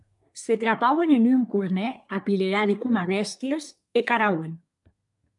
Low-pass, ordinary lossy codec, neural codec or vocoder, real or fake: 10.8 kHz; MP3, 96 kbps; codec, 24 kHz, 1 kbps, SNAC; fake